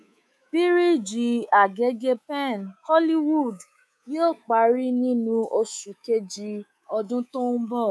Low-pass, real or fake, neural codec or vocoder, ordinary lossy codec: none; fake; codec, 24 kHz, 3.1 kbps, DualCodec; none